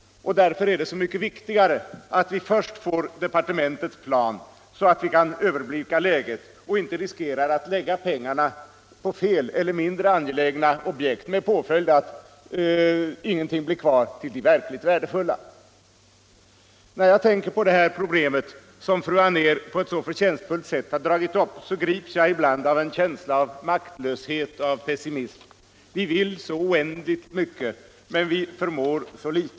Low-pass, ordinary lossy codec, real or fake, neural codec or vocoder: none; none; real; none